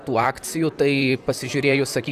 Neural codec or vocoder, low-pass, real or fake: vocoder, 44.1 kHz, 128 mel bands, Pupu-Vocoder; 14.4 kHz; fake